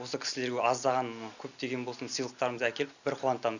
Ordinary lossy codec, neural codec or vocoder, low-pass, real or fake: none; none; 7.2 kHz; real